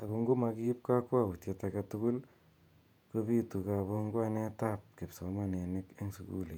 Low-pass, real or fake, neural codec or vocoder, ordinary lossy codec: 19.8 kHz; fake; vocoder, 48 kHz, 128 mel bands, Vocos; none